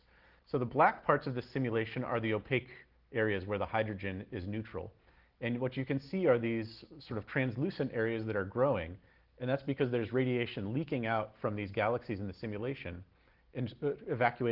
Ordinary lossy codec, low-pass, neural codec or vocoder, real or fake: Opus, 16 kbps; 5.4 kHz; none; real